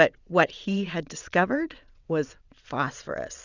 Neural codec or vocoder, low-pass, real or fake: vocoder, 22.05 kHz, 80 mel bands, WaveNeXt; 7.2 kHz; fake